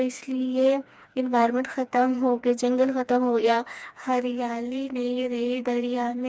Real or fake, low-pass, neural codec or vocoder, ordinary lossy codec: fake; none; codec, 16 kHz, 2 kbps, FreqCodec, smaller model; none